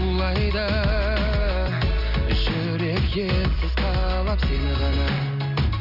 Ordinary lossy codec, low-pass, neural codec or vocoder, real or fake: none; 5.4 kHz; none; real